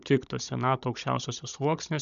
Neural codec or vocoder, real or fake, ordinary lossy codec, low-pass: codec, 16 kHz, 16 kbps, FunCodec, trained on Chinese and English, 50 frames a second; fake; MP3, 96 kbps; 7.2 kHz